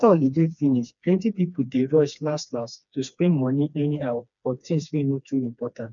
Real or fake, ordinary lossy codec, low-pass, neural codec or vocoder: fake; none; 7.2 kHz; codec, 16 kHz, 2 kbps, FreqCodec, smaller model